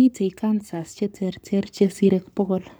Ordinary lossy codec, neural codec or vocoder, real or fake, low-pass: none; codec, 44.1 kHz, 7.8 kbps, Pupu-Codec; fake; none